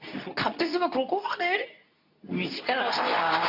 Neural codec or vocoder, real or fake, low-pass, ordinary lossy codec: codec, 24 kHz, 0.9 kbps, WavTokenizer, medium speech release version 2; fake; 5.4 kHz; none